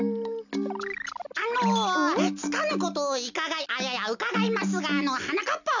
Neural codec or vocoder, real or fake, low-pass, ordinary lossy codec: none; real; 7.2 kHz; none